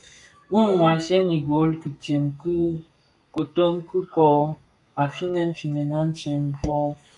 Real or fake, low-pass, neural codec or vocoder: fake; 10.8 kHz; codec, 32 kHz, 1.9 kbps, SNAC